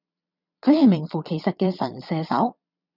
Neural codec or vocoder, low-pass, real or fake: vocoder, 22.05 kHz, 80 mel bands, Vocos; 5.4 kHz; fake